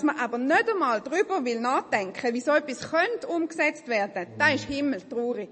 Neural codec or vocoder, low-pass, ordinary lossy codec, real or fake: none; 10.8 kHz; MP3, 32 kbps; real